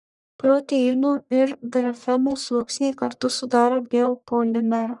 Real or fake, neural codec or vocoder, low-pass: fake; codec, 44.1 kHz, 1.7 kbps, Pupu-Codec; 10.8 kHz